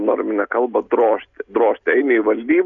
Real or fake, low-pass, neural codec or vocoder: real; 7.2 kHz; none